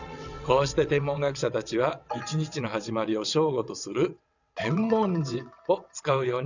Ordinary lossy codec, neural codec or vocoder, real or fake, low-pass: none; vocoder, 22.05 kHz, 80 mel bands, WaveNeXt; fake; 7.2 kHz